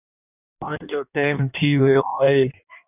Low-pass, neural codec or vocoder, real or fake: 3.6 kHz; codec, 16 kHz in and 24 kHz out, 1.1 kbps, FireRedTTS-2 codec; fake